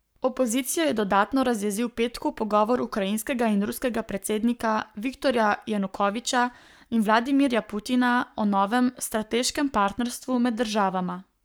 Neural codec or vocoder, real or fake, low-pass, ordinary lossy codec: codec, 44.1 kHz, 7.8 kbps, Pupu-Codec; fake; none; none